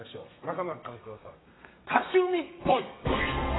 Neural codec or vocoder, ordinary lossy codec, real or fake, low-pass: codec, 32 kHz, 1.9 kbps, SNAC; AAC, 16 kbps; fake; 7.2 kHz